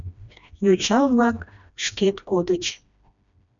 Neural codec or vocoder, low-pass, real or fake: codec, 16 kHz, 1 kbps, FreqCodec, smaller model; 7.2 kHz; fake